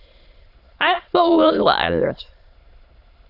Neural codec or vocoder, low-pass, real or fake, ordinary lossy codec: autoencoder, 22.05 kHz, a latent of 192 numbers a frame, VITS, trained on many speakers; 5.4 kHz; fake; Opus, 64 kbps